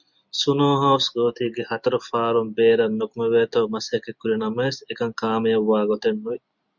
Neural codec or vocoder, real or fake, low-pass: none; real; 7.2 kHz